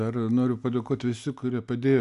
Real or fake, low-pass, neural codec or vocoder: real; 10.8 kHz; none